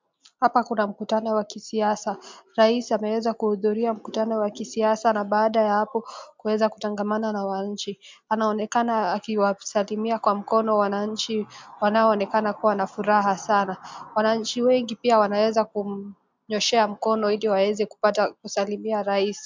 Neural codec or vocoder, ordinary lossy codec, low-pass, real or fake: none; MP3, 64 kbps; 7.2 kHz; real